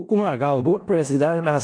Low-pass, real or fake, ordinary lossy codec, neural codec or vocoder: 9.9 kHz; fake; AAC, 48 kbps; codec, 16 kHz in and 24 kHz out, 0.4 kbps, LongCat-Audio-Codec, four codebook decoder